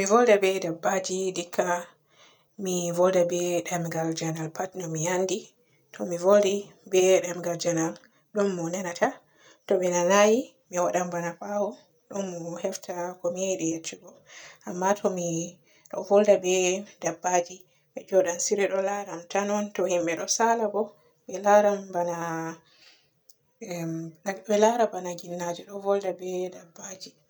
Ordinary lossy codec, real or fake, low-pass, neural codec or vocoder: none; real; none; none